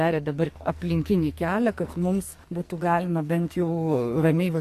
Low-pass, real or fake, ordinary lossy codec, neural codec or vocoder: 14.4 kHz; fake; AAC, 64 kbps; codec, 44.1 kHz, 2.6 kbps, SNAC